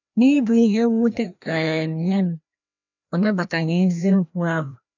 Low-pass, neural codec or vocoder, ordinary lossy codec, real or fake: 7.2 kHz; codec, 16 kHz, 1 kbps, FreqCodec, larger model; none; fake